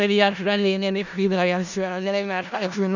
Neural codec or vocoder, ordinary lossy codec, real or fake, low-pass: codec, 16 kHz in and 24 kHz out, 0.4 kbps, LongCat-Audio-Codec, four codebook decoder; none; fake; 7.2 kHz